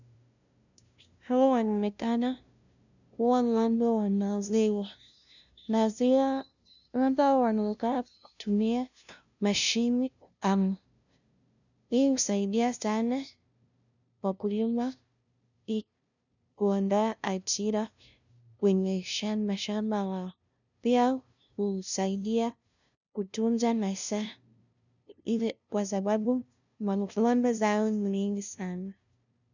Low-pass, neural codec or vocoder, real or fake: 7.2 kHz; codec, 16 kHz, 0.5 kbps, FunCodec, trained on LibriTTS, 25 frames a second; fake